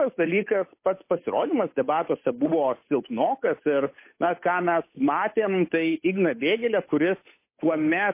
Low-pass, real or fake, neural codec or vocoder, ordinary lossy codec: 3.6 kHz; fake; codec, 16 kHz, 8 kbps, FunCodec, trained on Chinese and English, 25 frames a second; MP3, 24 kbps